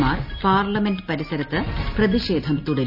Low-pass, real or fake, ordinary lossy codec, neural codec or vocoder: 5.4 kHz; real; none; none